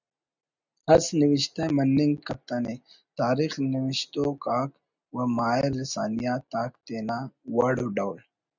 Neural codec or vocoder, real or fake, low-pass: none; real; 7.2 kHz